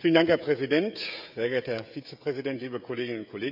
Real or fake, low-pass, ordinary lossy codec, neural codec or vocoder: fake; 5.4 kHz; AAC, 48 kbps; codec, 16 kHz, 16 kbps, FreqCodec, smaller model